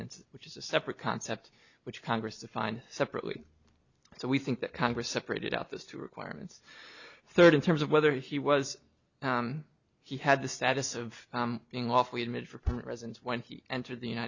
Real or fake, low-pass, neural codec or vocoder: real; 7.2 kHz; none